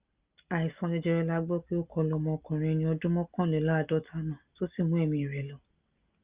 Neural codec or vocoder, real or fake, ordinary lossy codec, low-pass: none; real; Opus, 24 kbps; 3.6 kHz